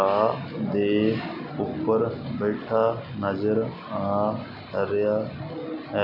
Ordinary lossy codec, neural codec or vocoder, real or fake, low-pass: none; none; real; 5.4 kHz